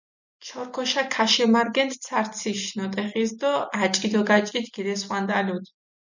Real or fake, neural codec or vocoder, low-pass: real; none; 7.2 kHz